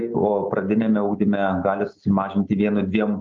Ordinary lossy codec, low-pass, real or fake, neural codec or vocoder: Opus, 24 kbps; 7.2 kHz; real; none